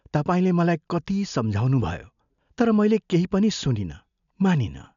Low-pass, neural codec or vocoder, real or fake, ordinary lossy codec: 7.2 kHz; none; real; none